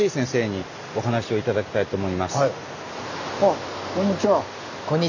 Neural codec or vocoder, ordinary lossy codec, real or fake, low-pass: none; none; real; 7.2 kHz